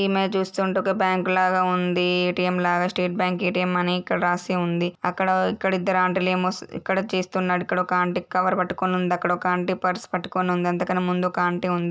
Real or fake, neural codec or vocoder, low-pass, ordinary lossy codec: real; none; none; none